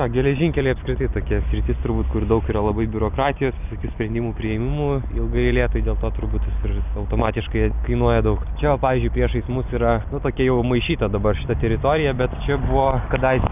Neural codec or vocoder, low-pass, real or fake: none; 3.6 kHz; real